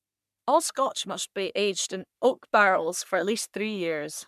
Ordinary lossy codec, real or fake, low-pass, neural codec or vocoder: none; fake; 14.4 kHz; codec, 44.1 kHz, 3.4 kbps, Pupu-Codec